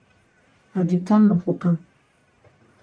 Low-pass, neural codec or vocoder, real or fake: 9.9 kHz; codec, 44.1 kHz, 1.7 kbps, Pupu-Codec; fake